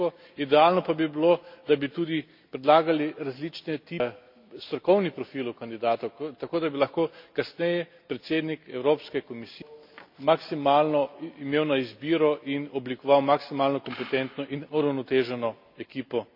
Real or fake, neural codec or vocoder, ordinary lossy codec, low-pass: real; none; MP3, 48 kbps; 5.4 kHz